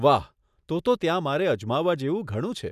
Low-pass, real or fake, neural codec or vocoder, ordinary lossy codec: 14.4 kHz; real; none; none